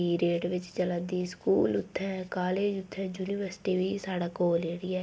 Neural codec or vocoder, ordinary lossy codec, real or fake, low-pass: none; none; real; none